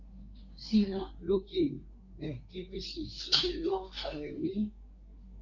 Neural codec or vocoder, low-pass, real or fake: codec, 24 kHz, 1 kbps, SNAC; 7.2 kHz; fake